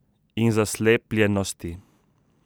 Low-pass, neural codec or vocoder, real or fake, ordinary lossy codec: none; none; real; none